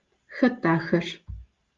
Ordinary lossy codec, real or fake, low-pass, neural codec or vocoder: Opus, 32 kbps; real; 7.2 kHz; none